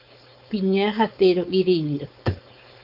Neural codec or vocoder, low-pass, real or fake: codec, 16 kHz, 4.8 kbps, FACodec; 5.4 kHz; fake